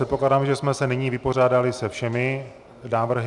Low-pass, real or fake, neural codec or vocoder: 10.8 kHz; real; none